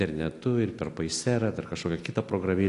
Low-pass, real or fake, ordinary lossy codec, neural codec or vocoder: 10.8 kHz; real; AAC, 48 kbps; none